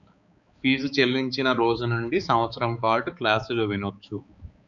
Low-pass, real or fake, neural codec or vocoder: 7.2 kHz; fake; codec, 16 kHz, 4 kbps, X-Codec, HuBERT features, trained on balanced general audio